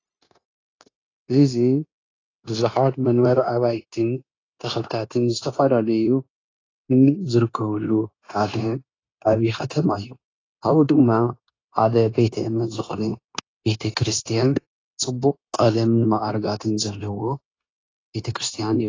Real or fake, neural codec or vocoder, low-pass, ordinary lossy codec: fake; codec, 16 kHz, 0.9 kbps, LongCat-Audio-Codec; 7.2 kHz; AAC, 32 kbps